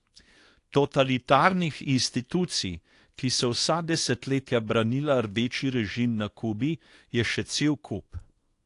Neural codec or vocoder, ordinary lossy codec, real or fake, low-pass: codec, 24 kHz, 0.9 kbps, WavTokenizer, small release; AAC, 48 kbps; fake; 10.8 kHz